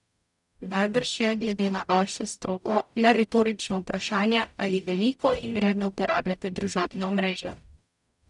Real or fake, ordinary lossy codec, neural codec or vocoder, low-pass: fake; none; codec, 44.1 kHz, 0.9 kbps, DAC; 10.8 kHz